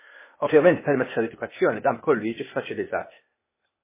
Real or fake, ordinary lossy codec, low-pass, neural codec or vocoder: fake; MP3, 16 kbps; 3.6 kHz; codec, 16 kHz, 0.8 kbps, ZipCodec